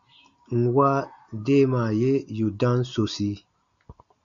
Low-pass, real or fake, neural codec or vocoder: 7.2 kHz; real; none